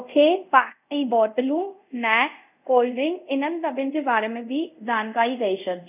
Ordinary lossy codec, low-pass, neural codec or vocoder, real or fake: none; 3.6 kHz; codec, 24 kHz, 0.5 kbps, DualCodec; fake